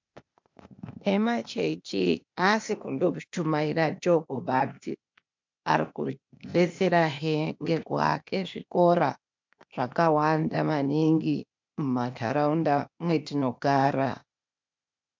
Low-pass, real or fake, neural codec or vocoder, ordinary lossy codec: 7.2 kHz; fake; codec, 16 kHz, 0.8 kbps, ZipCodec; MP3, 64 kbps